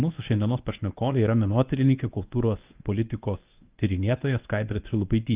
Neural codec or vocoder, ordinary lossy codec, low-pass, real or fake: codec, 24 kHz, 0.9 kbps, WavTokenizer, medium speech release version 1; Opus, 24 kbps; 3.6 kHz; fake